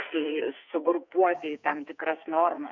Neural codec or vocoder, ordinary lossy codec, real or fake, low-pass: codec, 44.1 kHz, 2.6 kbps, SNAC; MP3, 48 kbps; fake; 7.2 kHz